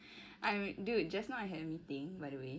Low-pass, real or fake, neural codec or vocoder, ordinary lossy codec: none; real; none; none